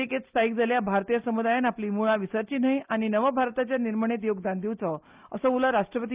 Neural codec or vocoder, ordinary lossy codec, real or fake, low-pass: none; Opus, 32 kbps; real; 3.6 kHz